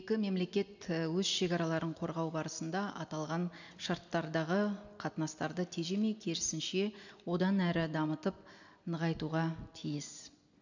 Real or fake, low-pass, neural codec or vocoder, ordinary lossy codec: real; 7.2 kHz; none; none